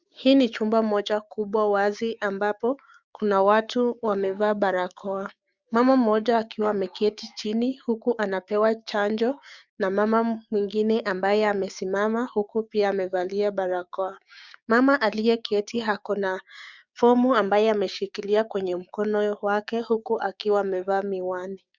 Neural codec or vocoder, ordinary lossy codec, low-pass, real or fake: codec, 16 kHz, 6 kbps, DAC; Opus, 64 kbps; 7.2 kHz; fake